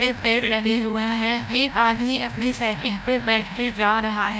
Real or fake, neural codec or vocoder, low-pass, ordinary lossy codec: fake; codec, 16 kHz, 0.5 kbps, FreqCodec, larger model; none; none